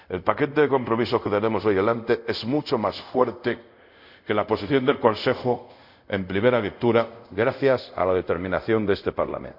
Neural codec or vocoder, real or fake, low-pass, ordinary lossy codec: codec, 24 kHz, 0.5 kbps, DualCodec; fake; 5.4 kHz; none